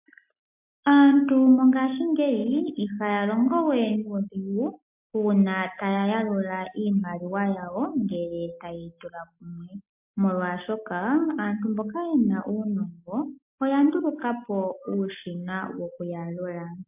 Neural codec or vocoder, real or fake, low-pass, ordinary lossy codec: none; real; 3.6 kHz; MP3, 32 kbps